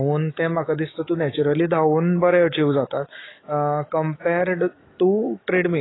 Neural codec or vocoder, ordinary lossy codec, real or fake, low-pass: autoencoder, 48 kHz, 128 numbers a frame, DAC-VAE, trained on Japanese speech; AAC, 16 kbps; fake; 7.2 kHz